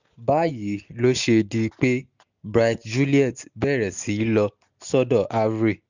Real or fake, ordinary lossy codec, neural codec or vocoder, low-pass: real; none; none; 7.2 kHz